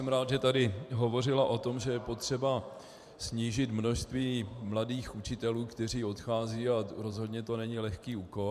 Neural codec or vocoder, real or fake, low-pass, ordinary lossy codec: vocoder, 44.1 kHz, 128 mel bands every 512 samples, BigVGAN v2; fake; 14.4 kHz; MP3, 96 kbps